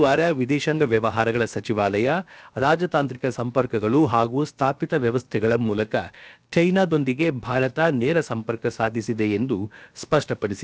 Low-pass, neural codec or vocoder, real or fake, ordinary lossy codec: none; codec, 16 kHz, about 1 kbps, DyCAST, with the encoder's durations; fake; none